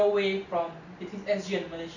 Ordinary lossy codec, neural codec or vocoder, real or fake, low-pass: none; none; real; 7.2 kHz